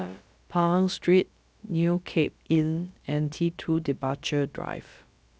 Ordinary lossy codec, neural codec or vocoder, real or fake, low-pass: none; codec, 16 kHz, about 1 kbps, DyCAST, with the encoder's durations; fake; none